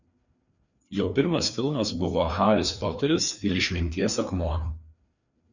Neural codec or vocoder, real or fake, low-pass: codec, 16 kHz, 2 kbps, FreqCodec, larger model; fake; 7.2 kHz